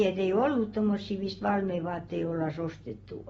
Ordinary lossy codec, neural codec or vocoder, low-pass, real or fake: AAC, 24 kbps; none; 19.8 kHz; real